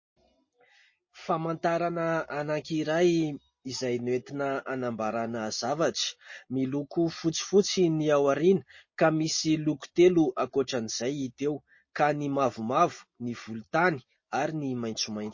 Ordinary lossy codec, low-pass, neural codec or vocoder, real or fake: MP3, 32 kbps; 7.2 kHz; none; real